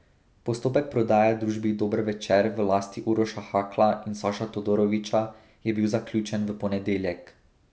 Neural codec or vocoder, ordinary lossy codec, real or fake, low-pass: none; none; real; none